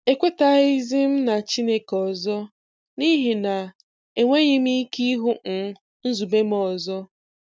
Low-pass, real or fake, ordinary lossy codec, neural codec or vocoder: none; real; none; none